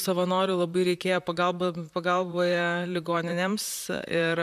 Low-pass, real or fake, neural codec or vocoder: 14.4 kHz; fake; vocoder, 44.1 kHz, 128 mel bands, Pupu-Vocoder